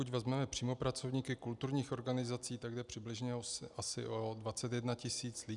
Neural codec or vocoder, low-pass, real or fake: none; 10.8 kHz; real